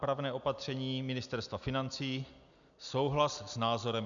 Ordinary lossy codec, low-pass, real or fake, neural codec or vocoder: MP3, 96 kbps; 7.2 kHz; real; none